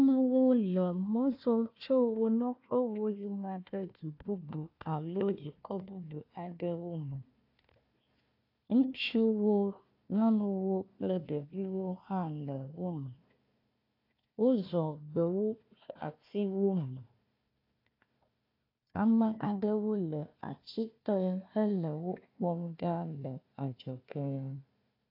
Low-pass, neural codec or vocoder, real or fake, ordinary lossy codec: 5.4 kHz; codec, 16 kHz, 1 kbps, FunCodec, trained on Chinese and English, 50 frames a second; fake; AAC, 32 kbps